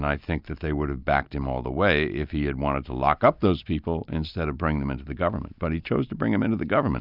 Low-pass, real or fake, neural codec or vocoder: 5.4 kHz; real; none